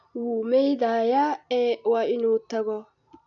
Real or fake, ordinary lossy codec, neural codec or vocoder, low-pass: real; none; none; 7.2 kHz